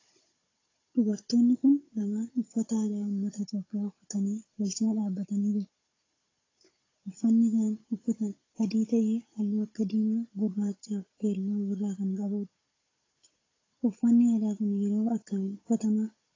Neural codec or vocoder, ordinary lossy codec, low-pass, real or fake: codec, 16 kHz, 16 kbps, FunCodec, trained on Chinese and English, 50 frames a second; AAC, 32 kbps; 7.2 kHz; fake